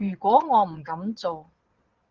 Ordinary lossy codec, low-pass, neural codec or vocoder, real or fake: Opus, 16 kbps; 7.2 kHz; vocoder, 22.05 kHz, 80 mel bands, Vocos; fake